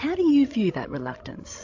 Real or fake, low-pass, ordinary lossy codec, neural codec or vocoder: fake; 7.2 kHz; AAC, 48 kbps; codec, 16 kHz, 16 kbps, FreqCodec, larger model